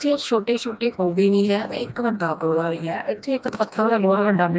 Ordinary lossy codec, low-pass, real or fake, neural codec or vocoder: none; none; fake; codec, 16 kHz, 1 kbps, FreqCodec, smaller model